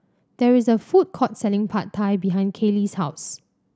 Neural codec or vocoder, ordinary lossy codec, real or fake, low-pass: none; none; real; none